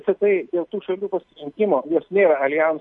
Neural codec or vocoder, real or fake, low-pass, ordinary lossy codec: none; real; 10.8 kHz; MP3, 48 kbps